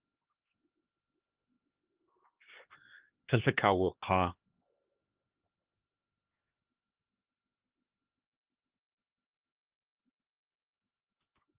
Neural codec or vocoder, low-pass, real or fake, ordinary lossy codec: codec, 16 kHz, 2 kbps, X-Codec, HuBERT features, trained on LibriSpeech; 3.6 kHz; fake; Opus, 16 kbps